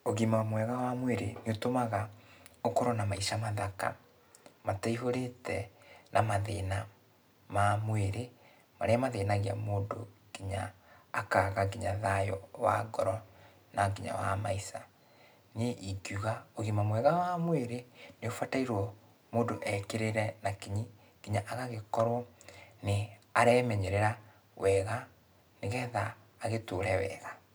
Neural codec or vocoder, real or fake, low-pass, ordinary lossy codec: none; real; none; none